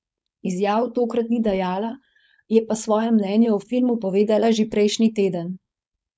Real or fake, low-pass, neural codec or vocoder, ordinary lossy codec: fake; none; codec, 16 kHz, 4.8 kbps, FACodec; none